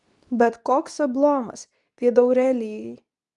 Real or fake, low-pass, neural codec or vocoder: fake; 10.8 kHz; codec, 24 kHz, 0.9 kbps, WavTokenizer, medium speech release version 2